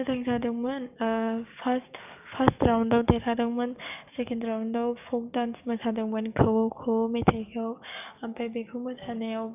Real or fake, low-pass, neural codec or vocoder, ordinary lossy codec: fake; 3.6 kHz; codec, 44.1 kHz, 7.8 kbps, DAC; none